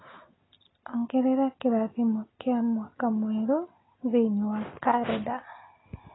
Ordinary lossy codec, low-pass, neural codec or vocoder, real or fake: AAC, 16 kbps; 7.2 kHz; none; real